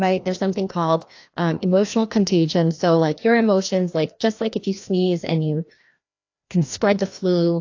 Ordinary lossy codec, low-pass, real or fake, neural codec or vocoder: AAC, 48 kbps; 7.2 kHz; fake; codec, 16 kHz, 1 kbps, FreqCodec, larger model